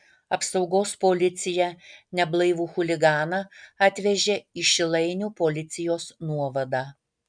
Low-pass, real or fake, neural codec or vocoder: 9.9 kHz; real; none